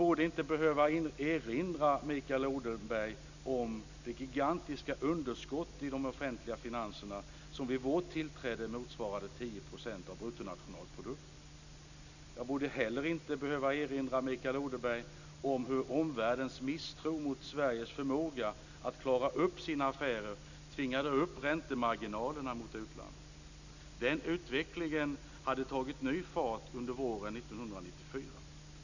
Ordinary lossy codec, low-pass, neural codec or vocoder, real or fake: none; 7.2 kHz; none; real